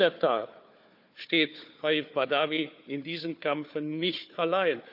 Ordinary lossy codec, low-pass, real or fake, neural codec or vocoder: none; 5.4 kHz; fake; codec, 16 kHz, 4 kbps, FunCodec, trained on Chinese and English, 50 frames a second